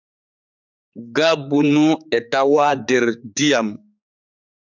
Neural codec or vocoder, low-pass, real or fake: codec, 16 kHz, 4 kbps, X-Codec, HuBERT features, trained on general audio; 7.2 kHz; fake